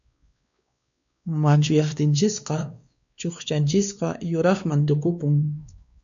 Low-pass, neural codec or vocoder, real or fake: 7.2 kHz; codec, 16 kHz, 2 kbps, X-Codec, WavLM features, trained on Multilingual LibriSpeech; fake